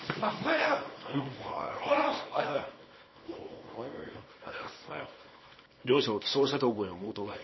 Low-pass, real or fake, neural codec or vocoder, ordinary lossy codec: 7.2 kHz; fake; codec, 24 kHz, 0.9 kbps, WavTokenizer, small release; MP3, 24 kbps